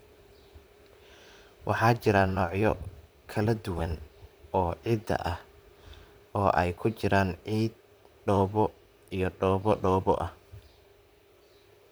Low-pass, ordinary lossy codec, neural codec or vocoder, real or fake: none; none; vocoder, 44.1 kHz, 128 mel bands, Pupu-Vocoder; fake